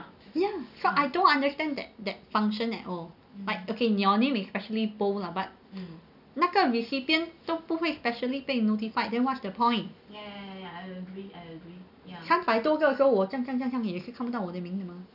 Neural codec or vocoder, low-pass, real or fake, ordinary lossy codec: none; 5.4 kHz; real; none